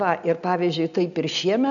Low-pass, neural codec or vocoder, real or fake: 7.2 kHz; none; real